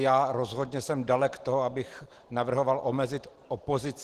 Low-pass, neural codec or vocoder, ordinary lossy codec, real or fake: 14.4 kHz; none; Opus, 16 kbps; real